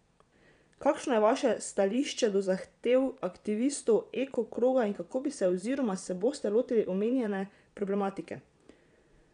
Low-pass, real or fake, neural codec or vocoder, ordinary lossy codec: 9.9 kHz; fake; vocoder, 22.05 kHz, 80 mel bands, Vocos; none